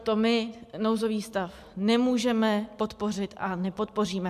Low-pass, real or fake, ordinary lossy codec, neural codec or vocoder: 14.4 kHz; real; MP3, 96 kbps; none